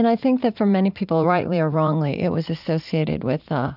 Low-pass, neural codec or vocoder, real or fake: 5.4 kHz; vocoder, 44.1 kHz, 80 mel bands, Vocos; fake